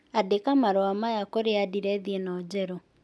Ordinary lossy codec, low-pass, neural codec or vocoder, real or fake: none; none; none; real